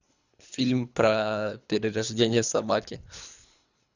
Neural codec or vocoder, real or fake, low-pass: codec, 24 kHz, 3 kbps, HILCodec; fake; 7.2 kHz